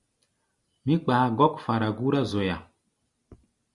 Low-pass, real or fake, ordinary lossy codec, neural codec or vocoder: 10.8 kHz; real; Opus, 64 kbps; none